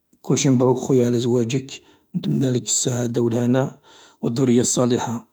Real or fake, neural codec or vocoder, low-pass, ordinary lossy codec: fake; autoencoder, 48 kHz, 32 numbers a frame, DAC-VAE, trained on Japanese speech; none; none